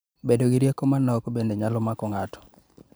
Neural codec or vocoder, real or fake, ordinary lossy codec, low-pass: vocoder, 44.1 kHz, 128 mel bands every 512 samples, BigVGAN v2; fake; none; none